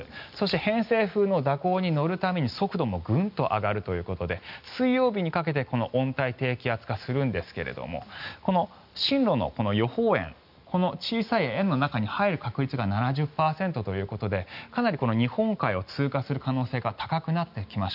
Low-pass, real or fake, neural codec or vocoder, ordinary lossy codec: 5.4 kHz; real; none; none